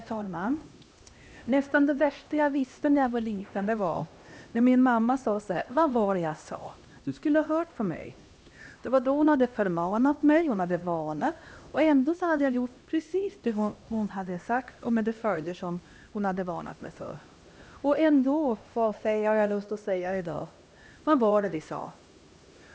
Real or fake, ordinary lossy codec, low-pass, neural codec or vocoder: fake; none; none; codec, 16 kHz, 1 kbps, X-Codec, HuBERT features, trained on LibriSpeech